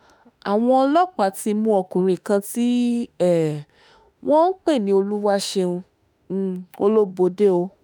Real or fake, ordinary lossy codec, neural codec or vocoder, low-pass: fake; none; autoencoder, 48 kHz, 32 numbers a frame, DAC-VAE, trained on Japanese speech; none